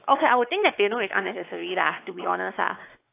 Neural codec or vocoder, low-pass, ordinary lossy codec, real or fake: codec, 16 kHz, 4 kbps, X-Codec, WavLM features, trained on Multilingual LibriSpeech; 3.6 kHz; AAC, 24 kbps; fake